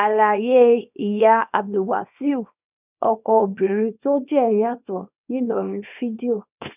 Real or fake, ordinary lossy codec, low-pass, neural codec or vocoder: fake; none; 3.6 kHz; codec, 24 kHz, 0.9 kbps, WavTokenizer, small release